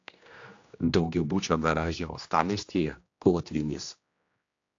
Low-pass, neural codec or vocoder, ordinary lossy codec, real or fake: 7.2 kHz; codec, 16 kHz, 1 kbps, X-Codec, HuBERT features, trained on general audio; AAC, 48 kbps; fake